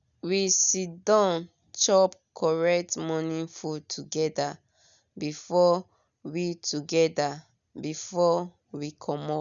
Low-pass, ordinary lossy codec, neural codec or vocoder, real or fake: 7.2 kHz; none; none; real